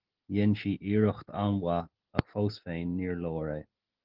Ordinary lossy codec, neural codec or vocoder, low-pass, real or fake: Opus, 16 kbps; none; 5.4 kHz; real